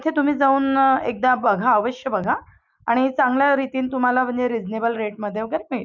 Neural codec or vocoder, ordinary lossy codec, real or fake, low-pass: none; none; real; 7.2 kHz